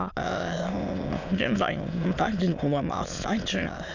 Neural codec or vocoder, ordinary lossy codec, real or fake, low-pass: autoencoder, 22.05 kHz, a latent of 192 numbers a frame, VITS, trained on many speakers; none; fake; 7.2 kHz